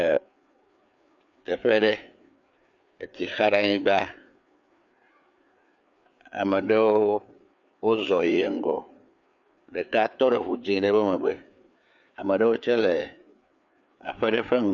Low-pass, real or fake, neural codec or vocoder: 7.2 kHz; fake; codec, 16 kHz, 4 kbps, FreqCodec, larger model